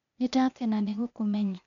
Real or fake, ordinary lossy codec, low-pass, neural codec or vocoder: fake; none; 7.2 kHz; codec, 16 kHz, 0.8 kbps, ZipCodec